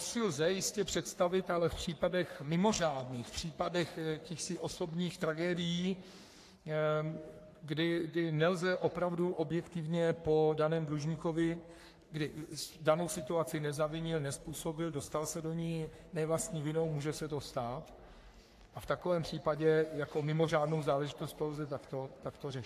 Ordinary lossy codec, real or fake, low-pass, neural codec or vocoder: AAC, 64 kbps; fake; 14.4 kHz; codec, 44.1 kHz, 3.4 kbps, Pupu-Codec